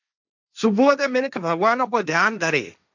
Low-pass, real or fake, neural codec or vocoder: 7.2 kHz; fake; codec, 16 kHz, 1.1 kbps, Voila-Tokenizer